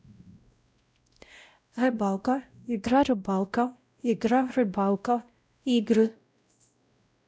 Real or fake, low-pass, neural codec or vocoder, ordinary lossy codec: fake; none; codec, 16 kHz, 0.5 kbps, X-Codec, WavLM features, trained on Multilingual LibriSpeech; none